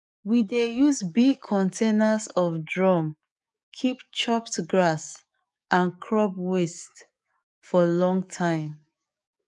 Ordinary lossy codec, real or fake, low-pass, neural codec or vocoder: none; fake; 10.8 kHz; codec, 44.1 kHz, 7.8 kbps, DAC